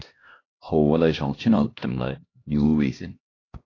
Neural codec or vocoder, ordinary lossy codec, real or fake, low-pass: codec, 16 kHz, 1 kbps, X-Codec, HuBERT features, trained on LibriSpeech; AAC, 32 kbps; fake; 7.2 kHz